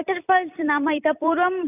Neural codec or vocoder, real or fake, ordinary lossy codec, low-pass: vocoder, 44.1 kHz, 128 mel bands every 256 samples, BigVGAN v2; fake; none; 3.6 kHz